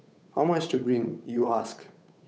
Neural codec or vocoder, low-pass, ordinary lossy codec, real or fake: codec, 16 kHz, 8 kbps, FunCodec, trained on Chinese and English, 25 frames a second; none; none; fake